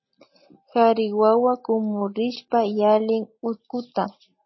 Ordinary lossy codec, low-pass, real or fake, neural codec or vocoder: MP3, 24 kbps; 7.2 kHz; real; none